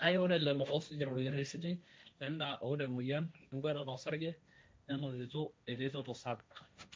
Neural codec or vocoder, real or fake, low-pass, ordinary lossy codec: codec, 16 kHz, 1.1 kbps, Voila-Tokenizer; fake; none; none